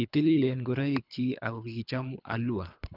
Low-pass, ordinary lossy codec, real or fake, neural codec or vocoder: 5.4 kHz; none; fake; codec, 24 kHz, 3 kbps, HILCodec